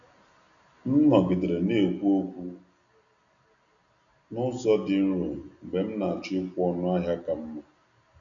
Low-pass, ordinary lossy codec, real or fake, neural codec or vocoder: 7.2 kHz; none; real; none